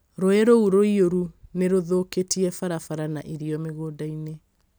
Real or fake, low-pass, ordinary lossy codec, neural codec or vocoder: real; none; none; none